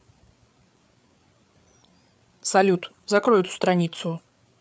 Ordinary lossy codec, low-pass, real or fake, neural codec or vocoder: none; none; fake; codec, 16 kHz, 8 kbps, FreqCodec, larger model